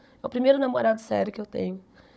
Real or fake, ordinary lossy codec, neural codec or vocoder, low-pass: fake; none; codec, 16 kHz, 16 kbps, FunCodec, trained on Chinese and English, 50 frames a second; none